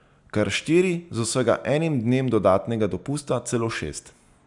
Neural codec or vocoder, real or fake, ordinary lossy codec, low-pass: none; real; none; 10.8 kHz